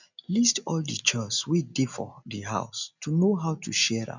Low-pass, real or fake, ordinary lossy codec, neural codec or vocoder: 7.2 kHz; real; none; none